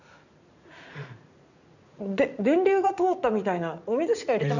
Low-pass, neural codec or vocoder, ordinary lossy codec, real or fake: 7.2 kHz; vocoder, 44.1 kHz, 80 mel bands, Vocos; none; fake